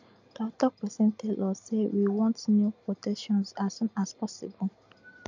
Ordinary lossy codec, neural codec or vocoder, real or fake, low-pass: none; none; real; 7.2 kHz